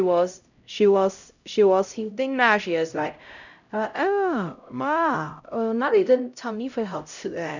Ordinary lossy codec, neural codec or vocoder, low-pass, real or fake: none; codec, 16 kHz, 0.5 kbps, X-Codec, HuBERT features, trained on LibriSpeech; 7.2 kHz; fake